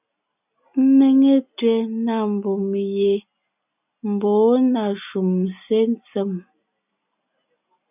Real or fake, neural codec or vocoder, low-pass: real; none; 3.6 kHz